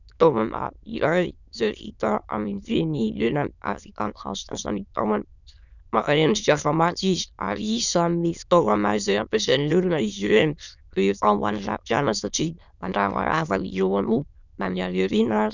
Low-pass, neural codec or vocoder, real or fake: 7.2 kHz; autoencoder, 22.05 kHz, a latent of 192 numbers a frame, VITS, trained on many speakers; fake